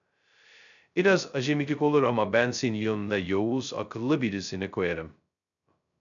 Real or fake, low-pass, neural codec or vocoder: fake; 7.2 kHz; codec, 16 kHz, 0.2 kbps, FocalCodec